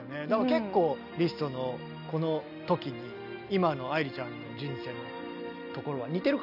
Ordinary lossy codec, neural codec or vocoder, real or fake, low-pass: none; none; real; 5.4 kHz